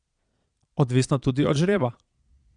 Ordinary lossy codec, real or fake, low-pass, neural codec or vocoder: none; fake; 9.9 kHz; vocoder, 22.05 kHz, 80 mel bands, Vocos